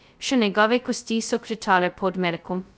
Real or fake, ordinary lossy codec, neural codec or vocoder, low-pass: fake; none; codec, 16 kHz, 0.2 kbps, FocalCodec; none